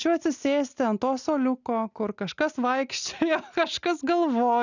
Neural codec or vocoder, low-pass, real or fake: none; 7.2 kHz; real